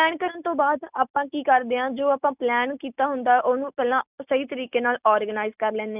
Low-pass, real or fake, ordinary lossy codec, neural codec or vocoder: 3.6 kHz; real; none; none